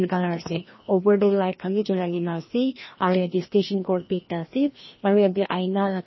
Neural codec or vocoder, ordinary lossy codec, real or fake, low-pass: codec, 16 kHz, 1 kbps, FreqCodec, larger model; MP3, 24 kbps; fake; 7.2 kHz